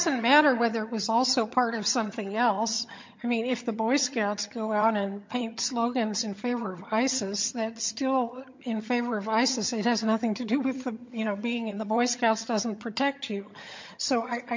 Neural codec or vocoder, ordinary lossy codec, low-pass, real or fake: vocoder, 22.05 kHz, 80 mel bands, HiFi-GAN; MP3, 48 kbps; 7.2 kHz; fake